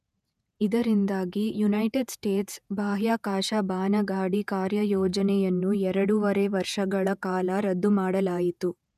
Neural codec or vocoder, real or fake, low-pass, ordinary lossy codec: vocoder, 48 kHz, 128 mel bands, Vocos; fake; 14.4 kHz; none